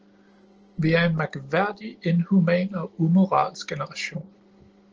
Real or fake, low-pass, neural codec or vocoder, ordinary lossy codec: real; 7.2 kHz; none; Opus, 16 kbps